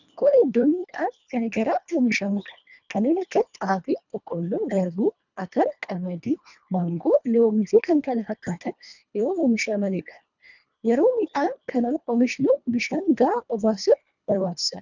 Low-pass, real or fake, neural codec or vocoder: 7.2 kHz; fake; codec, 24 kHz, 1.5 kbps, HILCodec